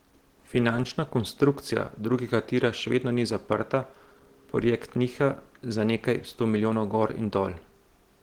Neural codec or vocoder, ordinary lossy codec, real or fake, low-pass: none; Opus, 16 kbps; real; 19.8 kHz